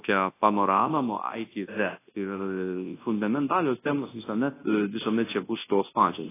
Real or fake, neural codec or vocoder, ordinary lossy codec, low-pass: fake; codec, 24 kHz, 0.9 kbps, WavTokenizer, large speech release; AAC, 16 kbps; 3.6 kHz